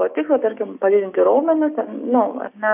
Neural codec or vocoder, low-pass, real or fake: codec, 16 kHz, 6 kbps, DAC; 3.6 kHz; fake